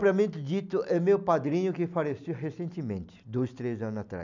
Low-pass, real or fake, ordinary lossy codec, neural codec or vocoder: 7.2 kHz; real; none; none